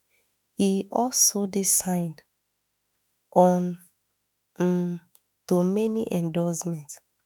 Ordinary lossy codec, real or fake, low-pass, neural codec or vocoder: none; fake; none; autoencoder, 48 kHz, 32 numbers a frame, DAC-VAE, trained on Japanese speech